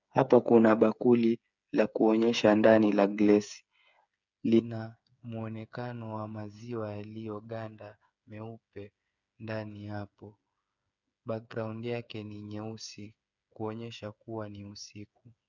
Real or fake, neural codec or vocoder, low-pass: fake; codec, 16 kHz, 8 kbps, FreqCodec, smaller model; 7.2 kHz